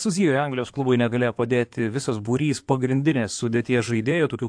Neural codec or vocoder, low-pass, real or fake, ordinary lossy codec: codec, 16 kHz in and 24 kHz out, 2.2 kbps, FireRedTTS-2 codec; 9.9 kHz; fake; AAC, 64 kbps